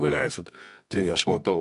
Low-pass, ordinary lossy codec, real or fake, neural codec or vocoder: 10.8 kHz; AAC, 96 kbps; fake; codec, 24 kHz, 0.9 kbps, WavTokenizer, medium music audio release